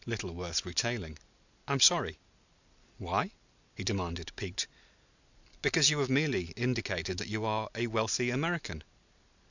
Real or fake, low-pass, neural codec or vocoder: real; 7.2 kHz; none